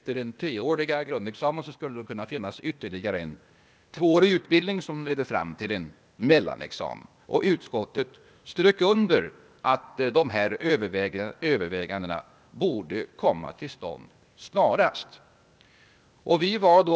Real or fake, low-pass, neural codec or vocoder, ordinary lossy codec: fake; none; codec, 16 kHz, 0.8 kbps, ZipCodec; none